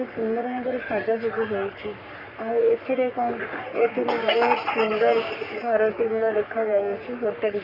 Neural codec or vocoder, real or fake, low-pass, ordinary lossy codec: codec, 44.1 kHz, 3.4 kbps, Pupu-Codec; fake; 5.4 kHz; none